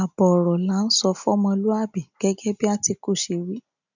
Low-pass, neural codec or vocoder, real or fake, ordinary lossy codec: 7.2 kHz; none; real; none